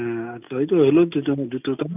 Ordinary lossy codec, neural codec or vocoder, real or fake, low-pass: none; none; real; 3.6 kHz